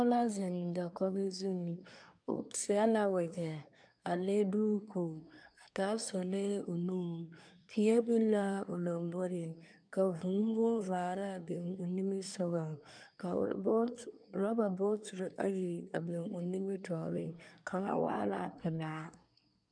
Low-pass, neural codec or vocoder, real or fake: 9.9 kHz; codec, 24 kHz, 1 kbps, SNAC; fake